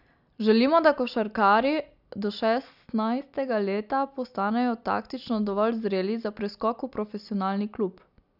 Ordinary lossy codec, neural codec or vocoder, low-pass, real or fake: none; none; 5.4 kHz; real